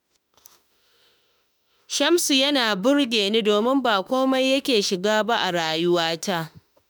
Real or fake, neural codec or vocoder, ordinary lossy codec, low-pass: fake; autoencoder, 48 kHz, 32 numbers a frame, DAC-VAE, trained on Japanese speech; none; none